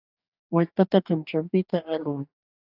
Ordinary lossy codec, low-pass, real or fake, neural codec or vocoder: AAC, 48 kbps; 5.4 kHz; fake; codec, 44.1 kHz, 2.6 kbps, DAC